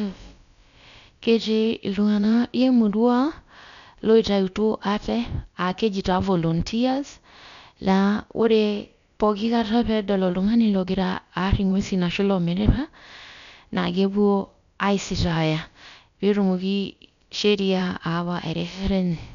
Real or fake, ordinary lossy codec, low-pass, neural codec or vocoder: fake; none; 7.2 kHz; codec, 16 kHz, about 1 kbps, DyCAST, with the encoder's durations